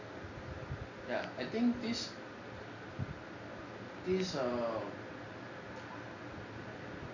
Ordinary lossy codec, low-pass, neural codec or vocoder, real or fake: none; 7.2 kHz; none; real